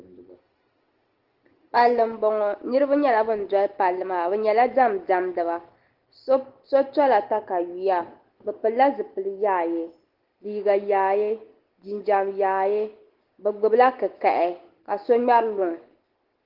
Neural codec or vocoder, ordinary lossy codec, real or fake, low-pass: none; Opus, 16 kbps; real; 5.4 kHz